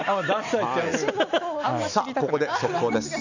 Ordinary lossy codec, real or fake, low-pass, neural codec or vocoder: none; real; 7.2 kHz; none